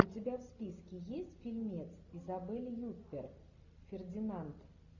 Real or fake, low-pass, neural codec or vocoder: real; 7.2 kHz; none